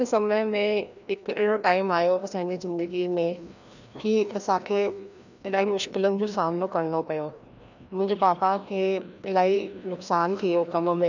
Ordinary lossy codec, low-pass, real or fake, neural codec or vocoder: none; 7.2 kHz; fake; codec, 16 kHz, 1 kbps, FreqCodec, larger model